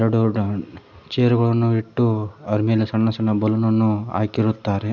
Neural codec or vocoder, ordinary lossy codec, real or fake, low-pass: none; none; real; 7.2 kHz